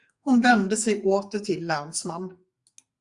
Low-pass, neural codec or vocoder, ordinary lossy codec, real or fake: 10.8 kHz; codec, 44.1 kHz, 2.6 kbps, SNAC; Opus, 64 kbps; fake